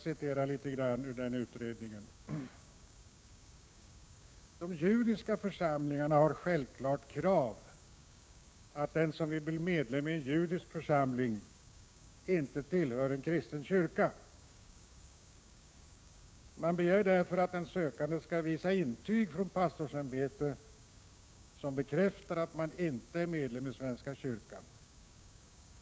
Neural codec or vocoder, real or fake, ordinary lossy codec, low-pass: codec, 16 kHz, 6 kbps, DAC; fake; none; none